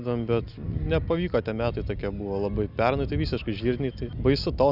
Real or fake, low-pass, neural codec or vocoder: real; 5.4 kHz; none